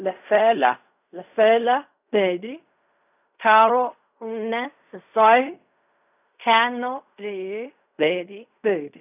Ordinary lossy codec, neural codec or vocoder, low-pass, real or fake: none; codec, 16 kHz in and 24 kHz out, 0.4 kbps, LongCat-Audio-Codec, fine tuned four codebook decoder; 3.6 kHz; fake